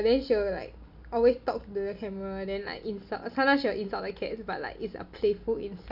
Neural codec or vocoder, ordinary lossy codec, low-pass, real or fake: none; none; 5.4 kHz; real